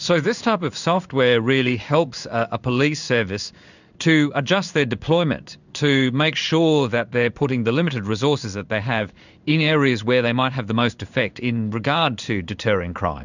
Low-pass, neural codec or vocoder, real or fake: 7.2 kHz; codec, 16 kHz in and 24 kHz out, 1 kbps, XY-Tokenizer; fake